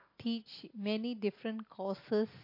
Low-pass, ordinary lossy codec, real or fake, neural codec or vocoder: 5.4 kHz; MP3, 32 kbps; real; none